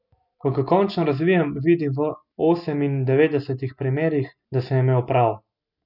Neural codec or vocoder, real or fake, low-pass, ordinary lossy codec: none; real; 5.4 kHz; none